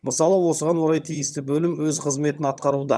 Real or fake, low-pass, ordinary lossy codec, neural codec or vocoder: fake; none; none; vocoder, 22.05 kHz, 80 mel bands, HiFi-GAN